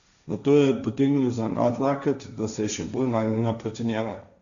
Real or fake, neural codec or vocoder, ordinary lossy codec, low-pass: fake; codec, 16 kHz, 1.1 kbps, Voila-Tokenizer; none; 7.2 kHz